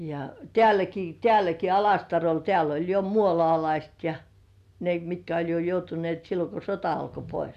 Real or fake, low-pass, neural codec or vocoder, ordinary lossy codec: real; 14.4 kHz; none; none